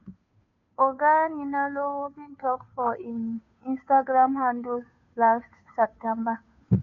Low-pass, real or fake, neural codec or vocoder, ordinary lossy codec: 7.2 kHz; fake; codec, 16 kHz, 2 kbps, FunCodec, trained on Chinese and English, 25 frames a second; AAC, 48 kbps